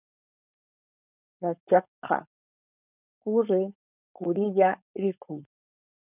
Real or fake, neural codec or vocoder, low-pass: fake; codec, 16 kHz, 4.8 kbps, FACodec; 3.6 kHz